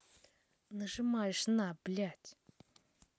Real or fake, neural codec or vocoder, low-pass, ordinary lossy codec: real; none; none; none